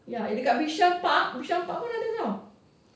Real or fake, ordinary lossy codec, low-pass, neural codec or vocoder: real; none; none; none